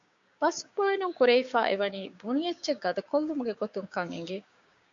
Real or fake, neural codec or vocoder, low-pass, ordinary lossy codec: fake; codec, 16 kHz, 6 kbps, DAC; 7.2 kHz; MP3, 64 kbps